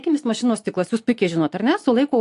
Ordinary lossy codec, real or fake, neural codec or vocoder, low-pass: AAC, 64 kbps; real; none; 10.8 kHz